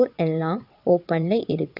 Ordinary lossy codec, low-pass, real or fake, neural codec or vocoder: none; 5.4 kHz; fake; vocoder, 22.05 kHz, 80 mel bands, WaveNeXt